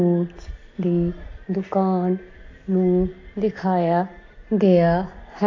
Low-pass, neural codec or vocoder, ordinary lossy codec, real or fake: 7.2 kHz; none; AAC, 32 kbps; real